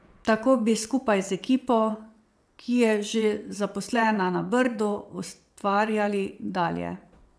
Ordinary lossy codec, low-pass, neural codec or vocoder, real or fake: none; none; vocoder, 22.05 kHz, 80 mel bands, WaveNeXt; fake